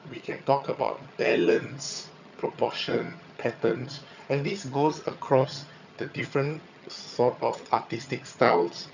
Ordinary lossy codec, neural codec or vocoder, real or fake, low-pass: none; vocoder, 22.05 kHz, 80 mel bands, HiFi-GAN; fake; 7.2 kHz